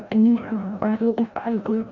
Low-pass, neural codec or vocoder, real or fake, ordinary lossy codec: 7.2 kHz; codec, 16 kHz, 0.5 kbps, FreqCodec, larger model; fake; MP3, 64 kbps